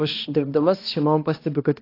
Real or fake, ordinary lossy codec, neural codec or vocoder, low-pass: fake; AAC, 32 kbps; codec, 16 kHz, 1 kbps, X-Codec, HuBERT features, trained on balanced general audio; 5.4 kHz